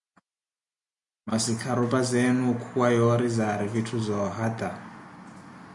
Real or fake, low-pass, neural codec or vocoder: real; 10.8 kHz; none